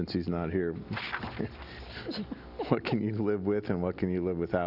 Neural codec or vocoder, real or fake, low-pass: none; real; 5.4 kHz